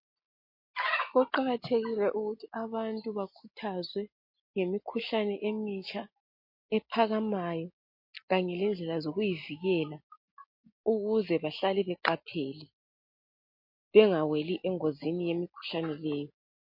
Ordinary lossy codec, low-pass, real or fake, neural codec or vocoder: MP3, 32 kbps; 5.4 kHz; real; none